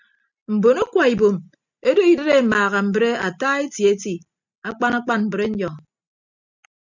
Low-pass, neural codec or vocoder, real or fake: 7.2 kHz; none; real